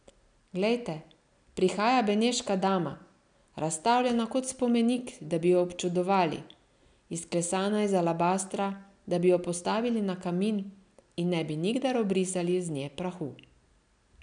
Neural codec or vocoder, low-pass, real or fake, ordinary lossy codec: none; 9.9 kHz; real; none